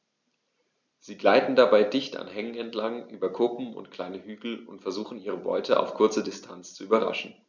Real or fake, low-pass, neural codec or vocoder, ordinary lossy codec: real; 7.2 kHz; none; none